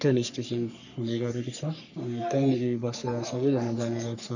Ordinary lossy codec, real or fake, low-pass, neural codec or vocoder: none; fake; 7.2 kHz; codec, 44.1 kHz, 3.4 kbps, Pupu-Codec